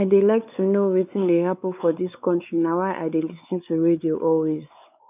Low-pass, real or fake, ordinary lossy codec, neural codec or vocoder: 3.6 kHz; fake; none; codec, 16 kHz, 4 kbps, X-Codec, WavLM features, trained on Multilingual LibriSpeech